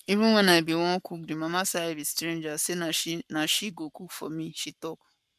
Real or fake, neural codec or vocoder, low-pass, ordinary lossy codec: fake; codec, 44.1 kHz, 7.8 kbps, Pupu-Codec; 14.4 kHz; MP3, 96 kbps